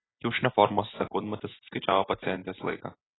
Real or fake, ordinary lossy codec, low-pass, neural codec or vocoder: real; AAC, 16 kbps; 7.2 kHz; none